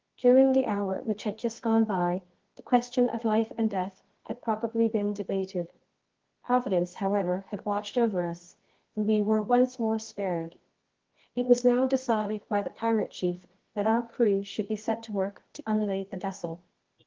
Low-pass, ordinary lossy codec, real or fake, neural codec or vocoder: 7.2 kHz; Opus, 16 kbps; fake; codec, 24 kHz, 0.9 kbps, WavTokenizer, medium music audio release